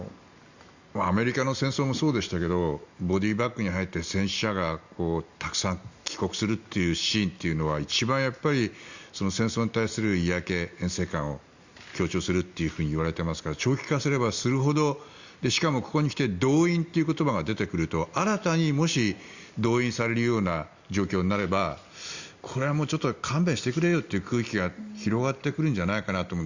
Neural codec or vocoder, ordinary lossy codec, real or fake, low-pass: none; Opus, 64 kbps; real; 7.2 kHz